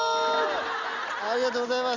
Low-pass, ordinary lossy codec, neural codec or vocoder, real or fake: 7.2 kHz; Opus, 64 kbps; none; real